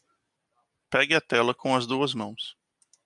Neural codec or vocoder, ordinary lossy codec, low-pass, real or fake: none; MP3, 96 kbps; 10.8 kHz; real